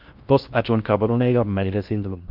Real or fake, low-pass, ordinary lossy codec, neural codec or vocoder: fake; 5.4 kHz; Opus, 24 kbps; codec, 16 kHz in and 24 kHz out, 0.6 kbps, FocalCodec, streaming, 2048 codes